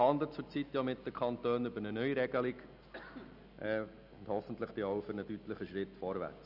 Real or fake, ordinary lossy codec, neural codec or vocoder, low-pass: real; none; none; 5.4 kHz